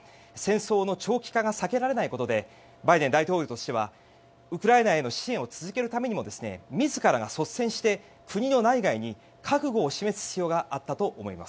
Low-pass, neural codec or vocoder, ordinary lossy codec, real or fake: none; none; none; real